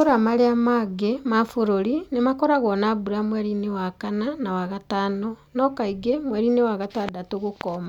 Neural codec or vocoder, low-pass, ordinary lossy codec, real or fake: none; 19.8 kHz; none; real